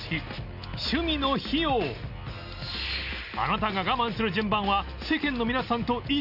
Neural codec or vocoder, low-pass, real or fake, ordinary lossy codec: none; 5.4 kHz; real; none